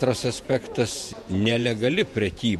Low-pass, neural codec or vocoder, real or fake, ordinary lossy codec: 19.8 kHz; vocoder, 48 kHz, 128 mel bands, Vocos; fake; MP3, 64 kbps